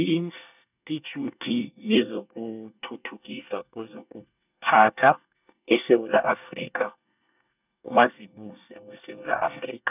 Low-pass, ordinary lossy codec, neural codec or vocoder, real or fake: 3.6 kHz; none; codec, 24 kHz, 1 kbps, SNAC; fake